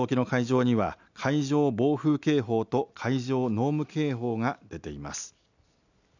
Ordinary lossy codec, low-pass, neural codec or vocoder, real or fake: none; 7.2 kHz; none; real